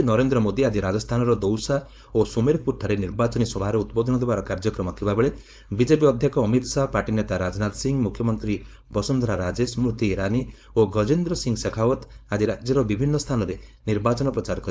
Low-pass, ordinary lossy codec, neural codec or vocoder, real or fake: none; none; codec, 16 kHz, 4.8 kbps, FACodec; fake